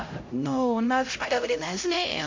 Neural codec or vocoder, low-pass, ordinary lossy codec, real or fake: codec, 16 kHz, 0.5 kbps, X-Codec, HuBERT features, trained on LibriSpeech; 7.2 kHz; MP3, 48 kbps; fake